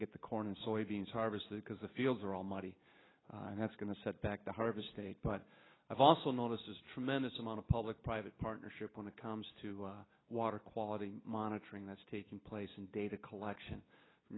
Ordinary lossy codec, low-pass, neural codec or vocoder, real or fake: AAC, 16 kbps; 7.2 kHz; none; real